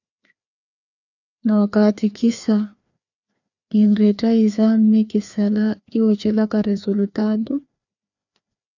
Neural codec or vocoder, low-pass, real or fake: codec, 16 kHz, 2 kbps, FreqCodec, larger model; 7.2 kHz; fake